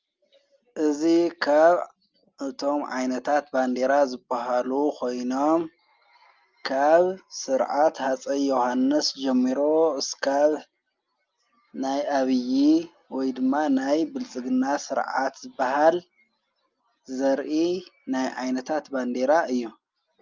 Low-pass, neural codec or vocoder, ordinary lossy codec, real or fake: 7.2 kHz; none; Opus, 24 kbps; real